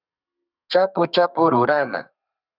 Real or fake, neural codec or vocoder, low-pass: fake; codec, 32 kHz, 1.9 kbps, SNAC; 5.4 kHz